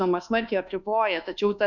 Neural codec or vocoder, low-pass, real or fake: codec, 24 kHz, 1.2 kbps, DualCodec; 7.2 kHz; fake